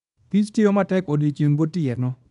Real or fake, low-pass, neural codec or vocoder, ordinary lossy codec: fake; 10.8 kHz; codec, 24 kHz, 0.9 kbps, WavTokenizer, small release; none